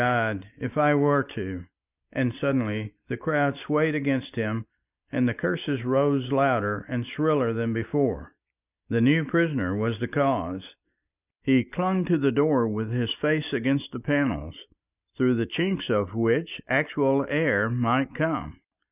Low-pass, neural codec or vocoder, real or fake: 3.6 kHz; none; real